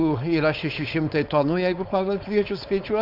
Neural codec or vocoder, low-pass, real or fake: codec, 16 kHz, 4.8 kbps, FACodec; 5.4 kHz; fake